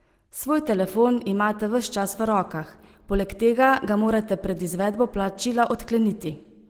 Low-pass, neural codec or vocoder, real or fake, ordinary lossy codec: 19.8 kHz; none; real; Opus, 16 kbps